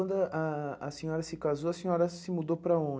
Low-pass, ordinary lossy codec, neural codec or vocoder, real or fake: none; none; none; real